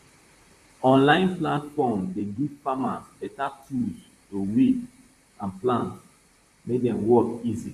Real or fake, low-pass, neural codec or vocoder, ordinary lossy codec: fake; 14.4 kHz; vocoder, 44.1 kHz, 128 mel bands, Pupu-Vocoder; none